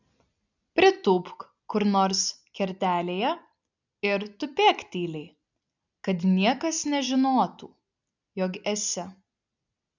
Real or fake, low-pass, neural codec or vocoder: real; 7.2 kHz; none